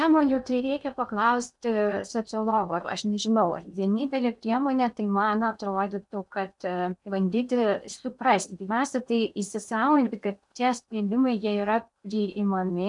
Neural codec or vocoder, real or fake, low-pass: codec, 16 kHz in and 24 kHz out, 0.8 kbps, FocalCodec, streaming, 65536 codes; fake; 10.8 kHz